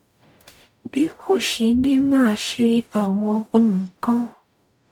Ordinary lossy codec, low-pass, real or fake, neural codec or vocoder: none; 19.8 kHz; fake; codec, 44.1 kHz, 0.9 kbps, DAC